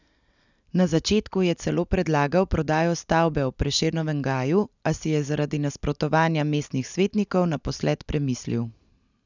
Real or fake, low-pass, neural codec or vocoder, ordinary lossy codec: real; 7.2 kHz; none; none